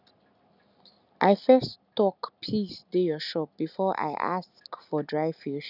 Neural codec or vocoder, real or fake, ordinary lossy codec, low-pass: none; real; none; 5.4 kHz